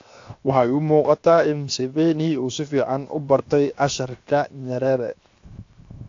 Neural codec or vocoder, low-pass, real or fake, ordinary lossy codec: codec, 16 kHz, 0.7 kbps, FocalCodec; 7.2 kHz; fake; AAC, 48 kbps